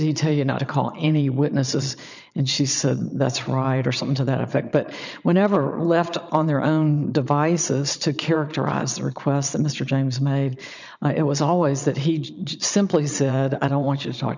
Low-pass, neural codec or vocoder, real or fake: 7.2 kHz; vocoder, 22.05 kHz, 80 mel bands, Vocos; fake